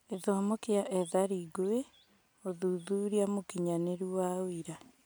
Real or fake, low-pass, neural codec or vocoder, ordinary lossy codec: real; none; none; none